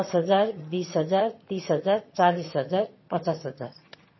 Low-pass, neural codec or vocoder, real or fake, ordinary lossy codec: 7.2 kHz; vocoder, 22.05 kHz, 80 mel bands, HiFi-GAN; fake; MP3, 24 kbps